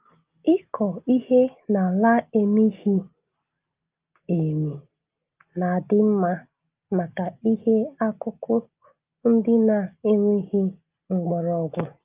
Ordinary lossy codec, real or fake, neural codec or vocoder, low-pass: Opus, 32 kbps; real; none; 3.6 kHz